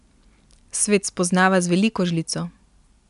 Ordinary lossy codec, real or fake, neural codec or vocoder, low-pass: none; real; none; 10.8 kHz